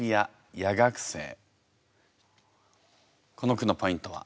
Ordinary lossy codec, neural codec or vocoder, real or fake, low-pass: none; none; real; none